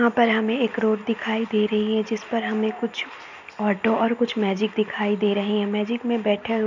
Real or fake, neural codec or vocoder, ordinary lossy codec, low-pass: real; none; none; 7.2 kHz